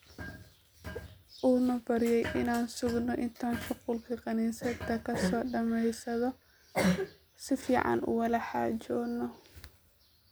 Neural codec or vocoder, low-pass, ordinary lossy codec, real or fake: none; none; none; real